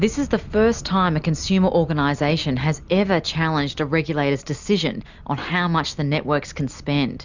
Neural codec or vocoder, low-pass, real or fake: none; 7.2 kHz; real